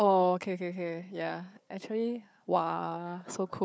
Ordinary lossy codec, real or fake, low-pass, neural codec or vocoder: none; fake; none; codec, 16 kHz, 4 kbps, FunCodec, trained on Chinese and English, 50 frames a second